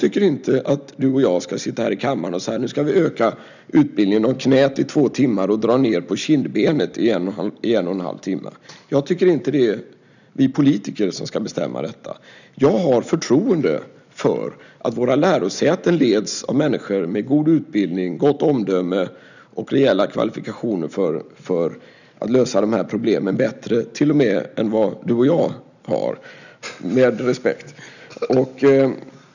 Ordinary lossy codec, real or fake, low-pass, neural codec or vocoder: none; real; 7.2 kHz; none